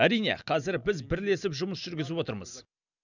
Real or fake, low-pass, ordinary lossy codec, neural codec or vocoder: real; 7.2 kHz; none; none